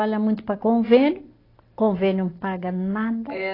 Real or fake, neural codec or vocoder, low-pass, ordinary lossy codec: real; none; 5.4 kHz; AAC, 24 kbps